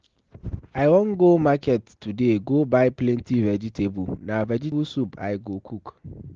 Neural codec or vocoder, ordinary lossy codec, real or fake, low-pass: none; Opus, 16 kbps; real; 7.2 kHz